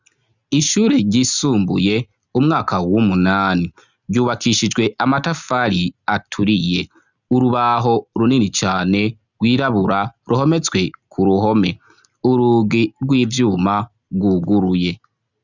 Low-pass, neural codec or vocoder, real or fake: 7.2 kHz; none; real